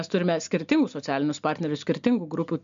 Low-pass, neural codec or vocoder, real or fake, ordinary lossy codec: 7.2 kHz; none; real; MP3, 64 kbps